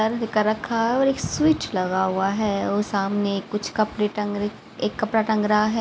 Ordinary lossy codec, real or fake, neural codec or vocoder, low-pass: none; real; none; none